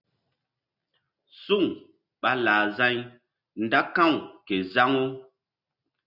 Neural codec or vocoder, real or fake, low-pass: none; real; 5.4 kHz